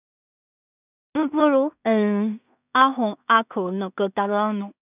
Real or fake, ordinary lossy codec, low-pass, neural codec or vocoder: fake; none; 3.6 kHz; codec, 16 kHz in and 24 kHz out, 0.4 kbps, LongCat-Audio-Codec, two codebook decoder